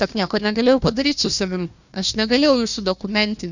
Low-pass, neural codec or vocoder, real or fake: 7.2 kHz; codec, 16 kHz, 1 kbps, FunCodec, trained on Chinese and English, 50 frames a second; fake